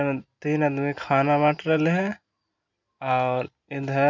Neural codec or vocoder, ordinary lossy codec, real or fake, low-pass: none; none; real; 7.2 kHz